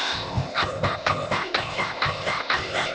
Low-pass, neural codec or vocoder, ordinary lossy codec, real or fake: none; codec, 16 kHz, 0.8 kbps, ZipCodec; none; fake